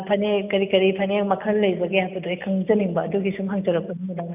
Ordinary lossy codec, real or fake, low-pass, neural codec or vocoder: none; real; 3.6 kHz; none